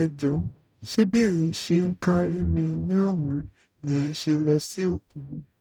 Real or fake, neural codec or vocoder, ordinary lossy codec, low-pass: fake; codec, 44.1 kHz, 0.9 kbps, DAC; none; 19.8 kHz